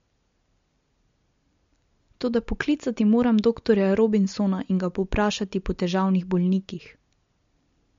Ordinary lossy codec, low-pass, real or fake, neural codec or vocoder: MP3, 48 kbps; 7.2 kHz; real; none